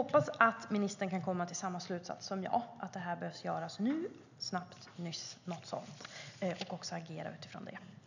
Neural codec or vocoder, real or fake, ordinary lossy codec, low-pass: none; real; none; 7.2 kHz